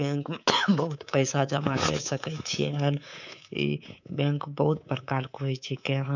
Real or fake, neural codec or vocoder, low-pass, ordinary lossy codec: fake; codec, 24 kHz, 3.1 kbps, DualCodec; 7.2 kHz; none